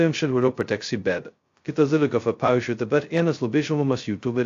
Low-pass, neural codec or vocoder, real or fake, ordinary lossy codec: 7.2 kHz; codec, 16 kHz, 0.2 kbps, FocalCodec; fake; AAC, 48 kbps